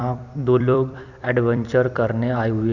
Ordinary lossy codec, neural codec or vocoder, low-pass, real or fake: none; vocoder, 44.1 kHz, 128 mel bands every 256 samples, BigVGAN v2; 7.2 kHz; fake